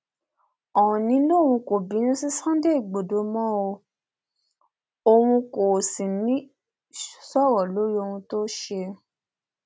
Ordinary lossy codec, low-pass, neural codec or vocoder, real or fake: none; none; none; real